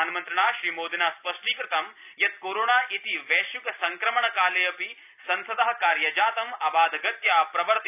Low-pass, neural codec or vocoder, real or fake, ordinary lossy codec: 3.6 kHz; none; real; none